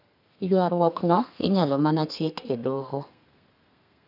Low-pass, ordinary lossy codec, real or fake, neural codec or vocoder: 5.4 kHz; none; fake; codec, 32 kHz, 1.9 kbps, SNAC